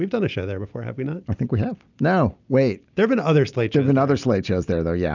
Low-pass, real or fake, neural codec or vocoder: 7.2 kHz; real; none